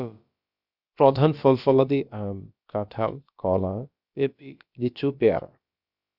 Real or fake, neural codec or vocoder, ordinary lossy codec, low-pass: fake; codec, 16 kHz, about 1 kbps, DyCAST, with the encoder's durations; Opus, 64 kbps; 5.4 kHz